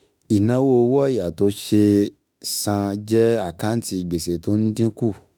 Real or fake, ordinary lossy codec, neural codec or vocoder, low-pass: fake; none; autoencoder, 48 kHz, 32 numbers a frame, DAC-VAE, trained on Japanese speech; none